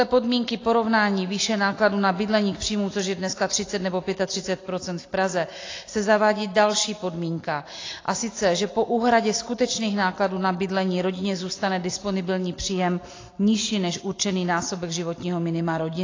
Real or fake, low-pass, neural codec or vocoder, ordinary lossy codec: real; 7.2 kHz; none; AAC, 32 kbps